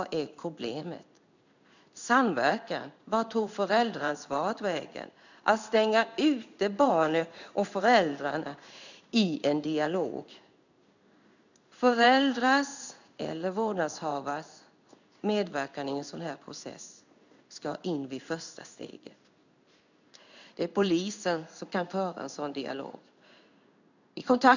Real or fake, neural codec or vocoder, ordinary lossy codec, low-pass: fake; codec, 16 kHz in and 24 kHz out, 1 kbps, XY-Tokenizer; none; 7.2 kHz